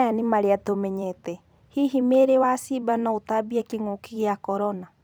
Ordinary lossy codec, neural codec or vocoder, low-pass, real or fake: none; vocoder, 44.1 kHz, 128 mel bands every 256 samples, BigVGAN v2; none; fake